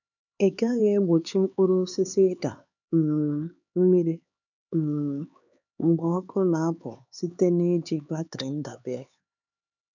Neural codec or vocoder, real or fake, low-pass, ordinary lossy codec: codec, 16 kHz, 4 kbps, X-Codec, HuBERT features, trained on LibriSpeech; fake; 7.2 kHz; none